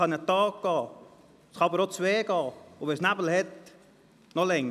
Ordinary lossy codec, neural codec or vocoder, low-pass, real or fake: none; none; 14.4 kHz; real